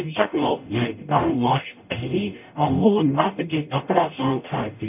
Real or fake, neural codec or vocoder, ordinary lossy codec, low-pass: fake; codec, 44.1 kHz, 0.9 kbps, DAC; none; 3.6 kHz